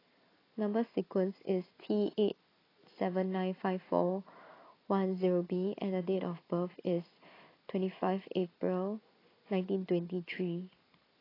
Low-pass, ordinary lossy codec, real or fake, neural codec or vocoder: 5.4 kHz; AAC, 24 kbps; real; none